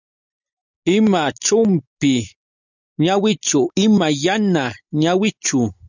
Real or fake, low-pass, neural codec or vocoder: real; 7.2 kHz; none